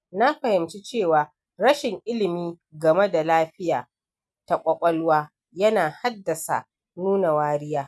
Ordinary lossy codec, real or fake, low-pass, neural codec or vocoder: none; real; none; none